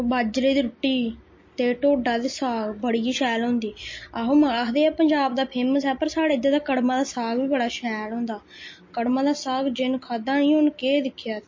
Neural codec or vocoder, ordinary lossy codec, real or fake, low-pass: none; MP3, 32 kbps; real; 7.2 kHz